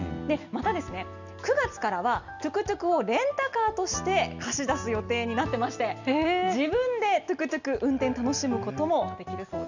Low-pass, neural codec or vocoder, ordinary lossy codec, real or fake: 7.2 kHz; none; none; real